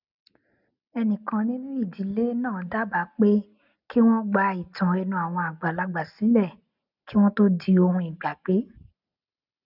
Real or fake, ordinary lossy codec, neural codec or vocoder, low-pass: real; none; none; 5.4 kHz